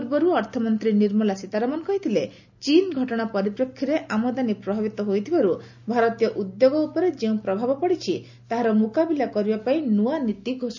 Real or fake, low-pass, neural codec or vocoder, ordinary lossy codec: real; 7.2 kHz; none; none